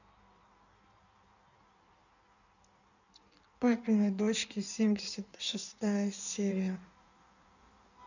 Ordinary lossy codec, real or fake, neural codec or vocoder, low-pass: none; fake; codec, 16 kHz in and 24 kHz out, 1.1 kbps, FireRedTTS-2 codec; 7.2 kHz